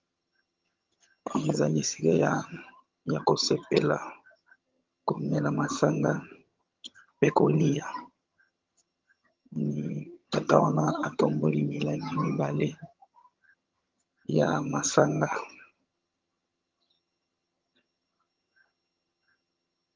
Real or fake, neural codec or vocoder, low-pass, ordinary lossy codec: fake; vocoder, 22.05 kHz, 80 mel bands, HiFi-GAN; 7.2 kHz; Opus, 32 kbps